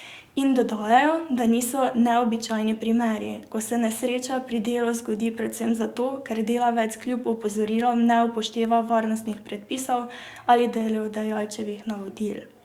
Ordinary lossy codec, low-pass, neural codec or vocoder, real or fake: Opus, 64 kbps; 19.8 kHz; codec, 44.1 kHz, 7.8 kbps, DAC; fake